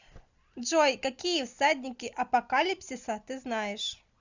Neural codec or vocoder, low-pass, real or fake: none; 7.2 kHz; real